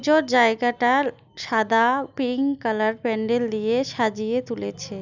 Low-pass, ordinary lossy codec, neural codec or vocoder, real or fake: 7.2 kHz; none; none; real